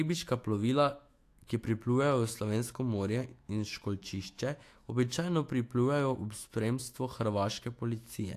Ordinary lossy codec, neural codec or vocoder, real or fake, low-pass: AAC, 64 kbps; autoencoder, 48 kHz, 128 numbers a frame, DAC-VAE, trained on Japanese speech; fake; 14.4 kHz